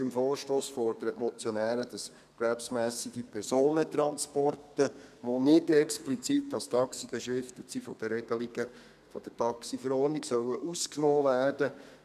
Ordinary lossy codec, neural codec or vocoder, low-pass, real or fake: none; codec, 32 kHz, 1.9 kbps, SNAC; 14.4 kHz; fake